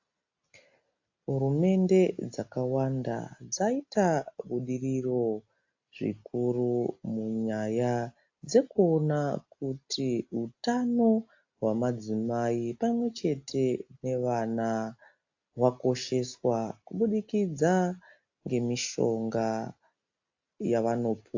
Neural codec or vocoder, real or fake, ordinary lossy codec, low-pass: none; real; AAC, 48 kbps; 7.2 kHz